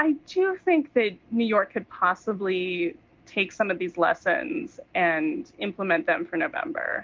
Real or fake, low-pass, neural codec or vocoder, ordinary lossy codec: real; 7.2 kHz; none; Opus, 32 kbps